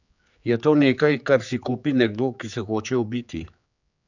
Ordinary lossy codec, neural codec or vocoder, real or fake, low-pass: none; codec, 16 kHz, 4 kbps, X-Codec, HuBERT features, trained on general audio; fake; 7.2 kHz